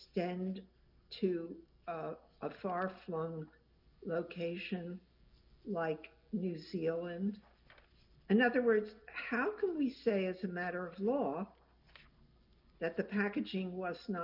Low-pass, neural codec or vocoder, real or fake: 5.4 kHz; none; real